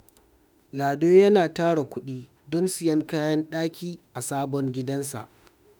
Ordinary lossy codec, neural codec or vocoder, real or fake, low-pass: none; autoencoder, 48 kHz, 32 numbers a frame, DAC-VAE, trained on Japanese speech; fake; none